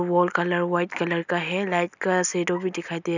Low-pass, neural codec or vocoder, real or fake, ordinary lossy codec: 7.2 kHz; none; real; none